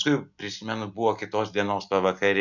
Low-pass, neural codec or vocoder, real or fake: 7.2 kHz; none; real